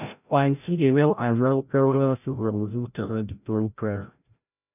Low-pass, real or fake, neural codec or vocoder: 3.6 kHz; fake; codec, 16 kHz, 0.5 kbps, FreqCodec, larger model